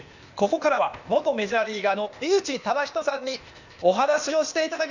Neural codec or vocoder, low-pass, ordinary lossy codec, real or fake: codec, 16 kHz, 0.8 kbps, ZipCodec; 7.2 kHz; none; fake